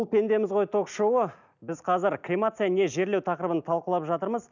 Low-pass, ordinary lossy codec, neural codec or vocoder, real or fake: 7.2 kHz; none; none; real